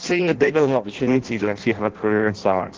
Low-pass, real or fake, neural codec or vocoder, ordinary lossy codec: 7.2 kHz; fake; codec, 16 kHz in and 24 kHz out, 0.6 kbps, FireRedTTS-2 codec; Opus, 16 kbps